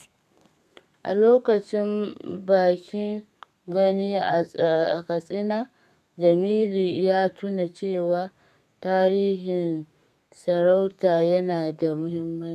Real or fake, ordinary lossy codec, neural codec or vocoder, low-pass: fake; none; codec, 32 kHz, 1.9 kbps, SNAC; 14.4 kHz